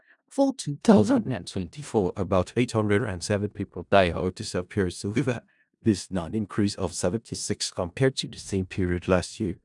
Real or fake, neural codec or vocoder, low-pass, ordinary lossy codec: fake; codec, 16 kHz in and 24 kHz out, 0.4 kbps, LongCat-Audio-Codec, four codebook decoder; 10.8 kHz; none